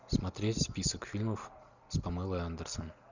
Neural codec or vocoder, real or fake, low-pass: none; real; 7.2 kHz